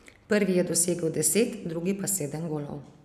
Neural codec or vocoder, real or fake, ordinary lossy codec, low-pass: none; real; none; 14.4 kHz